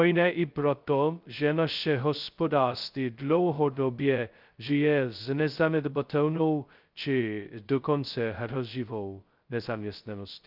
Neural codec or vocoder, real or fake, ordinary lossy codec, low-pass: codec, 16 kHz, 0.2 kbps, FocalCodec; fake; Opus, 24 kbps; 5.4 kHz